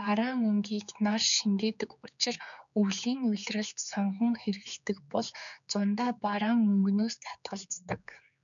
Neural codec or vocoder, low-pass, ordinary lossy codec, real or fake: codec, 16 kHz, 4 kbps, X-Codec, HuBERT features, trained on general audio; 7.2 kHz; MP3, 96 kbps; fake